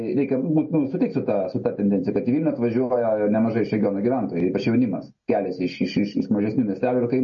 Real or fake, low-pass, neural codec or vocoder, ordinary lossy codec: real; 7.2 kHz; none; MP3, 32 kbps